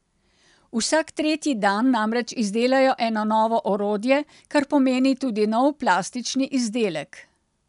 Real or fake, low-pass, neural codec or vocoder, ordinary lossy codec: real; 10.8 kHz; none; none